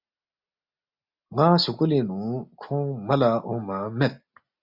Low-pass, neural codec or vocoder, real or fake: 5.4 kHz; none; real